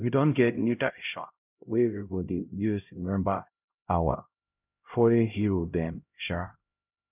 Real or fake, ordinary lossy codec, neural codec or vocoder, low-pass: fake; none; codec, 16 kHz, 0.5 kbps, X-Codec, HuBERT features, trained on LibriSpeech; 3.6 kHz